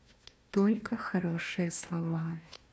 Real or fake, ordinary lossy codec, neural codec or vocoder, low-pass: fake; none; codec, 16 kHz, 1 kbps, FunCodec, trained on Chinese and English, 50 frames a second; none